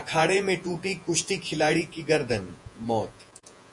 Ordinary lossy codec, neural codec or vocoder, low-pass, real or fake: MP3, 48 kbps; vocoder, 48 kHz, 128 mel bands, Vocos; 10.8 kHz; fake